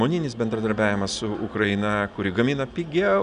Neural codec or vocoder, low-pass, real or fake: none; 9.9 kHz; real